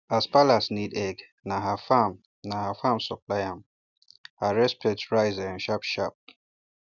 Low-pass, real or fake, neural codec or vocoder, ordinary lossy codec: 7.2 kHz; real; none; none